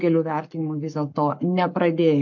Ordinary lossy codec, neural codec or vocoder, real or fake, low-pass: MP3, 48 kbps; codec, 44.1 kHz, 7.8 kbps, Pupu-Codec; fake; 7.2 kHz